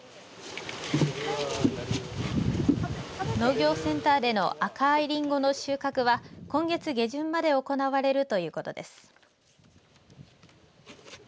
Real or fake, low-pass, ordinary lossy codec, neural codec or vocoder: real; none; none; none